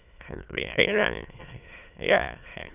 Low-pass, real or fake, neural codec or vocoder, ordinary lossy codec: 3.6 kHz; fake; autoencoder, 22.05 kHz, a latent of 192 numbers a frame, VITS, trained on many speakers; none